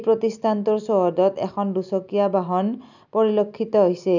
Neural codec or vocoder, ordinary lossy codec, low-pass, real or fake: none; none; 7.2 kHz; real